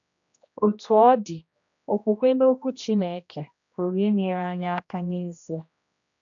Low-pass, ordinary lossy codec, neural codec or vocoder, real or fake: 7.2 kHz; none; codec, 16 kHz, 1 kbps, X-Codec, HuBERT features, trained on general audio; fake